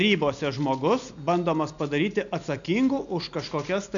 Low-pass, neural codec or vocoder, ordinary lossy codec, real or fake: 7.2 kHz; none; MP3, 96 kbps; real